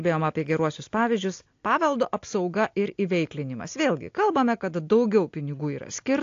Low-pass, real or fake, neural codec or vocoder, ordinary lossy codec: 7.2 kHz; real; none; AAC, 48 kbps